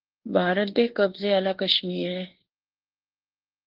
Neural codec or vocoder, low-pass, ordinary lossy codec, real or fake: codec, 24 kHz, 1.2 kbps, DualCodec; 5.4 kHz; Opus, 16 kbps; fake